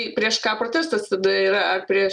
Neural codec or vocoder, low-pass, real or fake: none; 10.8 kHz; real